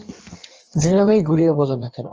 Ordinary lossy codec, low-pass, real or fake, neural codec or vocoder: Opus, 24 kbps; 7.2 kHz; fake; codec, 16 kHz in and 24 kHz out, 1.1 kbps, FireRedTTS-2 codec